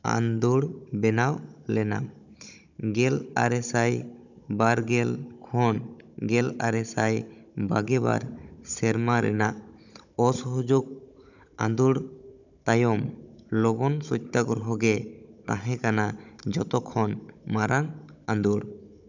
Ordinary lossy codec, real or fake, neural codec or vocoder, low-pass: none; fake; codec, 16 kHz, 16 kbps, FunCodec, trained on Chinese and English, 50 frames a second; 7.2 kHz